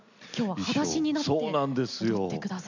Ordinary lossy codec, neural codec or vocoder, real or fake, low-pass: none; none; real; 7.2 kHz